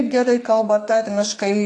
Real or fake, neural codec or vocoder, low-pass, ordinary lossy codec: fake; codec, 44.1 kHz, 2.6 kbps, SNAC; 9.9 kHz; AAC, 48 kbps